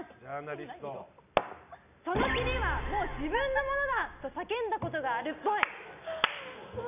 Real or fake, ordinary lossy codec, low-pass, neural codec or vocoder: real; none; 3.6 kHz; none